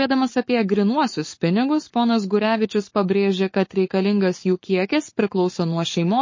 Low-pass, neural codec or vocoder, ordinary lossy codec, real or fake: 7.2 kHz; codec, 44.1 kHz, 7.8 kbps, Pupu-Codec; MP3, 32 kbps; fake